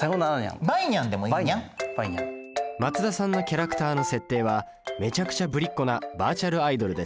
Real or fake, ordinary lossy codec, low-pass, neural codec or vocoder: real; none; none; none